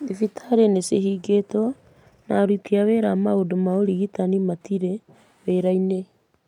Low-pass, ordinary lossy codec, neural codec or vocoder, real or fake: 19.8 kHz; MP3, 96 kbps; none; real